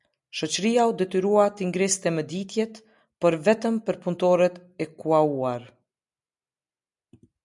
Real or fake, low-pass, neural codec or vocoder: real; 10.8 kHz; none